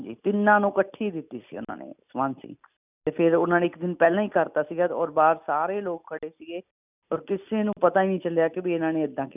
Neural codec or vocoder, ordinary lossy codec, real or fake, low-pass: none; none; real; 3.6 kHz